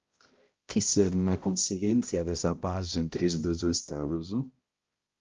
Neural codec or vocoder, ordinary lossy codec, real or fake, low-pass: codec, 16 kHz, 0.5 kbps, X-Codec, HuBERT features, trained on balanced general audio; Opus, 32 kbps; fake; 7.2 kHz